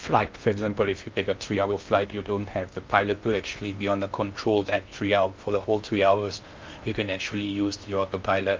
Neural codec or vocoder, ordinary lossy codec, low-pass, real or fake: codec, 16 kHz in and 24 kHz out, 0.6 kbps, FocalCodec, streaming, 2048 codes; Opus, 32 kbps; 7.2 kHz; fake